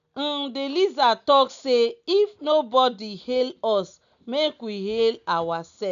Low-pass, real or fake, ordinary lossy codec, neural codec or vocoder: 7.2 kHz; real; none; none